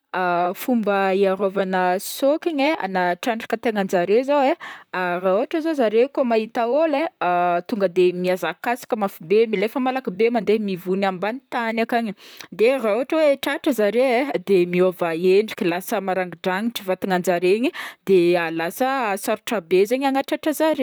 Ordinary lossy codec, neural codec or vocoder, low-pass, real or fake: none; vocoder, 44.1 kHz, 128 mel bands, Pupu-Vocoder; none; fake